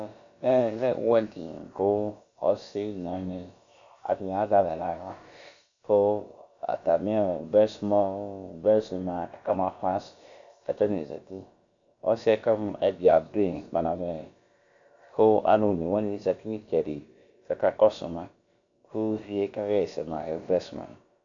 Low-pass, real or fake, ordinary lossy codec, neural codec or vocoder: 7.2 kHz; fake; AAC, 64 kbps; codec, 16 kHz, about 1 kbps, DyCAST, with the encoder's durations